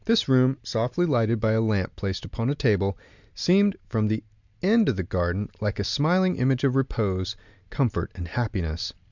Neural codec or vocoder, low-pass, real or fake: none; 7.2 kHz; real